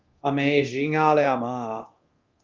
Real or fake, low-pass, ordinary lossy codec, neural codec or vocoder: fake; 7.2 kHz; Opus, 24 kbps; codec, 24 kHz, 0.9 kbps, DualCodec